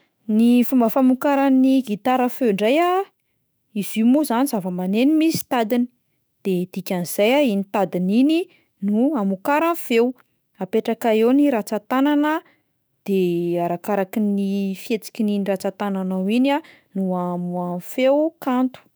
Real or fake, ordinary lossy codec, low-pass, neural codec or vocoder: fake; none; none; autoencoder, 48 kHz, 128 numbers a frame, DAC-VAE, trained on Japanese speech